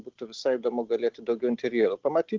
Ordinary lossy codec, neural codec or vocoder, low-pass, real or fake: Opus, 16 kbps; none; 7.2 kHz; real